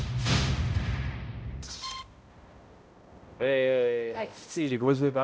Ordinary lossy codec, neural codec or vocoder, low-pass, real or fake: none; codec, 16 kHz, 0.5 kbps, X-Codec, HuBERT features, trained on balanced general audio; none; fake